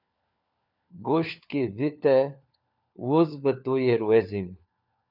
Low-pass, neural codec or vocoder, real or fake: 5.4 kHz; codec, 16 kHz, 4 kbps, FunCodec, trained on LibriTTS, 50 frames a second; fake